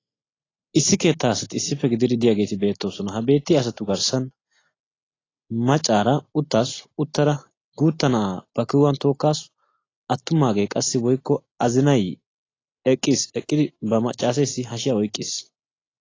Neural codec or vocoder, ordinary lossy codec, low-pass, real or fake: none; AAC, 32 kbps; 7.2 kHz; real